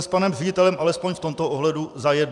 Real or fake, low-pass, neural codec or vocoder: fake; 10.8 kHz; vocoder, 44.1 kHz, 128 mel bands every 256 samples, BigVGAN v2